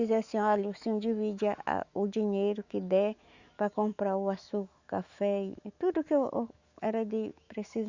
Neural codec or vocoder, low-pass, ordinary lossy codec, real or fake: autoencoder, 48 kHz, 128 numbers a frame, DAC-VAE, trained on Japanese speech; 7.2 kHz; Opus, 64 kbps; fake